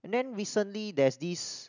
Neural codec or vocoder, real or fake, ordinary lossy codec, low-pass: none; real; none; 7.2 kHz